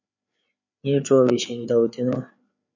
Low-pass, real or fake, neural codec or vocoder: 7.2 kHz; fake; codec, 16 kHz, 4 kbps, FreqCodec, larger model